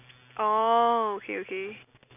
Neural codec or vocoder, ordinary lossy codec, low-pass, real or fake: none; none; 3.6 kHz; real